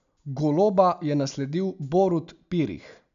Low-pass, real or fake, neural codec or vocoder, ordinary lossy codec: 7.2 kHz; real; none; none